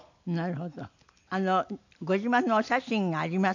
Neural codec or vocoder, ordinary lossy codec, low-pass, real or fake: none; none; 7.2 kHz; real